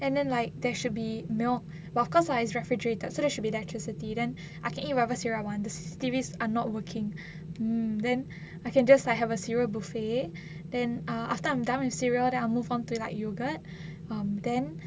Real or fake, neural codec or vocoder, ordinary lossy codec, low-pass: real; none; none; none